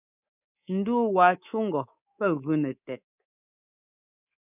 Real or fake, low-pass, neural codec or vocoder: fake; 3.6 kHz; codec, 24 kHz, 3.1 kbps, DualCodec